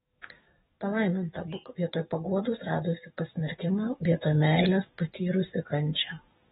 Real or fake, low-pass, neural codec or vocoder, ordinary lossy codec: fake; 19.8 kHz; codec, 44.1 kHz, 7.8 kbps, DAC; AAC, 16 kbps